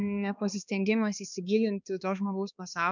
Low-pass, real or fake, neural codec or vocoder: 7.2 kHz; fake; codec, 16 kHz, 2 kbps, X-Codec, HuBERT features, trained on balanced general audio